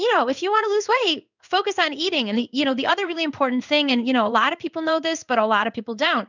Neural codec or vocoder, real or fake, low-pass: codec, 16 kHz in and 24 kHz out, 1 kbps, XY-Tokenizer; fake; 7.2 kHz